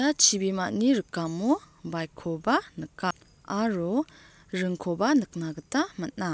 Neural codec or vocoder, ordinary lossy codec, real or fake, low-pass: none; none; real; none